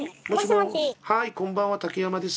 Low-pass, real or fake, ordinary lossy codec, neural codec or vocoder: none; real; none; none